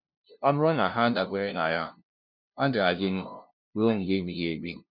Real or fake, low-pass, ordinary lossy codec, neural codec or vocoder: fake; 5.4 kHz; none; codec, 16 kHz, 0.5 kbps, FunCodec, trained on LibriTTS, 25 frames a second